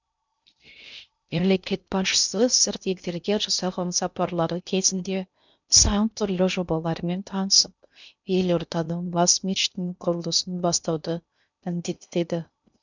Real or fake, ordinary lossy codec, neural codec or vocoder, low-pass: fake; none; codec, 16 kHz in and 24 kHz out, 0.6 kbps, FocalCodec, streaming, 2048 codes; 7.2 kHz